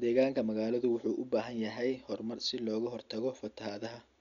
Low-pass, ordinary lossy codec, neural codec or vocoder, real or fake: 7.2 kHz; none; none; real